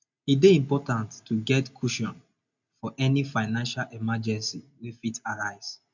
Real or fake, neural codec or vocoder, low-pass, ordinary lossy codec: real; none; 7.2 kHz; none